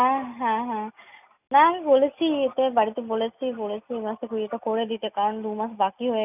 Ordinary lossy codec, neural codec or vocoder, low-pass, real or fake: none; none; 3.6 kHz; real